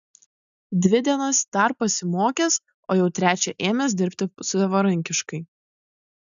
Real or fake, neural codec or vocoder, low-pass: real; none; 7.2 kHz